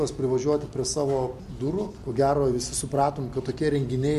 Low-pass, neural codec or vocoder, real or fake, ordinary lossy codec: 14.4 kHz; none; real; MP3, 64 kbps